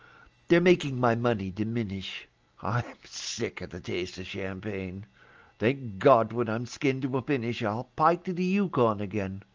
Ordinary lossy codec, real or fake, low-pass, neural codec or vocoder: Opus, 24 kbps; real; 7.2 kHz; none